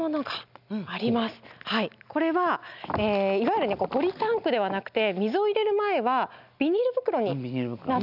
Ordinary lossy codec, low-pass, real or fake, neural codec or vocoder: none; 5.4 kHz; real; none